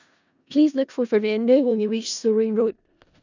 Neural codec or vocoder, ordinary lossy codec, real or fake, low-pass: codec, 16 kHz in and 24 kHz out, 0.4 kbps, LongCat-Audio-Codec, four codebook decoder; none; fake; 7.2 kHz